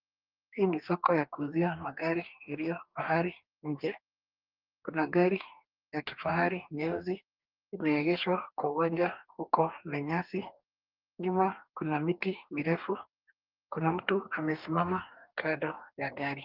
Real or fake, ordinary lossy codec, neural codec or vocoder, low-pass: fake; Opus, 24 kbps; codec, 44.1 kHz, 2.6 kbps, DAC; 5.4 kHz